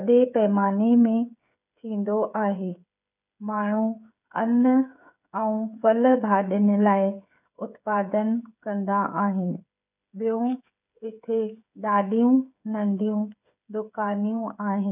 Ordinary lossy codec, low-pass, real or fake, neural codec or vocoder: none; 3.6 kHz; fake; codec, 16 kHz, 8 kbps, FreqCodec, smaller model